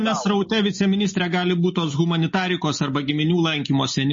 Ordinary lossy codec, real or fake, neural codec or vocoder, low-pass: MP3, 32 kbps; real; none; 7.2 kHz